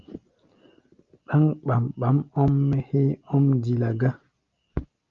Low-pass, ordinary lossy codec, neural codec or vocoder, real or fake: 7.2 kHz; Opus, 32 kbps; none; real